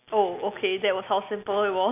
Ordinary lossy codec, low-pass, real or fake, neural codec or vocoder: none; 3.6 kHz; real; none